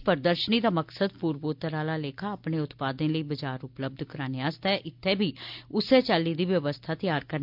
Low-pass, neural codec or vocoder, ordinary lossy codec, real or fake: 5.4 kHz; none; MP3, 48 kbps; real